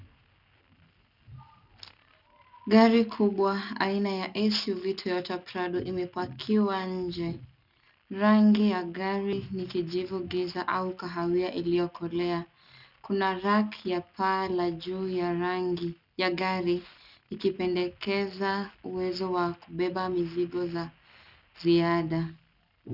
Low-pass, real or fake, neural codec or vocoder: 5.4 kHz; real; none